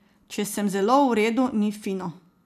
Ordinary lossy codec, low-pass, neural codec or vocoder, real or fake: none; 14.4 kHz; none; real